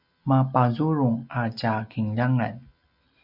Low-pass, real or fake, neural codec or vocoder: 5.4 kHz; real; none